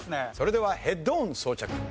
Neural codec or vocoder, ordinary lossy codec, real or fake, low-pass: none; none; real; none